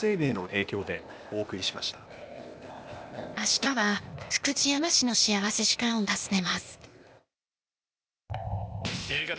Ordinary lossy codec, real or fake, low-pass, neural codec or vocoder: none; fake; none; codec, 16 kHz, 0.8 kbps, ZipCodec